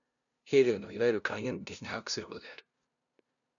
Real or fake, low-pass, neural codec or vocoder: fake; 7.2 kHz; codec, 16 kHz, 0.5 kbps, FunCodec, trained on LibriTTS, 25 frames a second